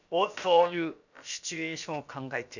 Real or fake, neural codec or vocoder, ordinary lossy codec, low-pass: fake; codec, 16 kHz, about 1 kbps, DyCAST, with the encoder's durations; none; 7.2 kHz